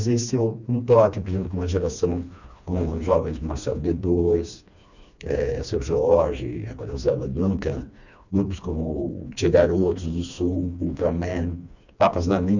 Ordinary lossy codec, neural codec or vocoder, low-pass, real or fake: none; codec, 16 kHz, 2 kbps, FreqCodec, smaller model; 7.2 kHz; fake